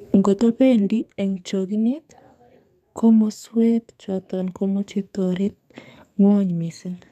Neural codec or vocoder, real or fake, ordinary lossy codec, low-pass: codec, 32 kHz, 1.9 kbps, SNAC; fake; none; 14.4 kHz